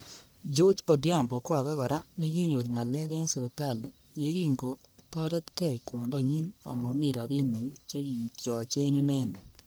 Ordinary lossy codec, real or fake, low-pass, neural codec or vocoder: none; fake; none; codec, 44.1 kHz, 1.7 kbps, Pupu-Codec